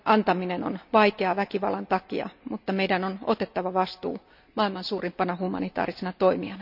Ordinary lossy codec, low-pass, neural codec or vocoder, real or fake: none; 5.4 kHz; none; real